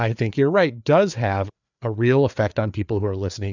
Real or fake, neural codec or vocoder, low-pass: fake; codec, 16 kHz, 4 kbps, FreqCodec, larger model; 7.2 kHz